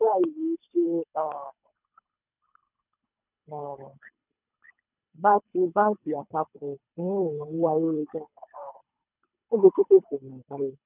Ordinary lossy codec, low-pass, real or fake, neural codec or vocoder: none; 3.6 kHz; fake; codec, 24 kHz, 3 kbps, HILCodec